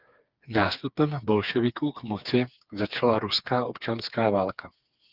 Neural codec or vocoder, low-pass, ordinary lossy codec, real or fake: codec, 16 kHz, 4 kbps, FreqCodec, smaller model; 5.4 kHz; Opus, 32 kbps; fake